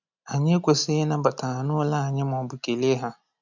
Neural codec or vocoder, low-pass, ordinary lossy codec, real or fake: none; 7.2 kHz; none; real